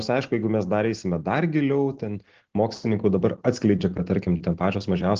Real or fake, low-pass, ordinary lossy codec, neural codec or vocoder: real; 7.2 kHz; Opus, 16 kbps; none